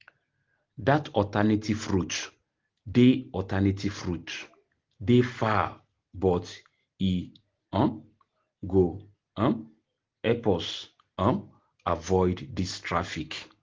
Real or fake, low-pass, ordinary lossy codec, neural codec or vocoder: real; 7.2 kHz; Opus, 16 kbps; none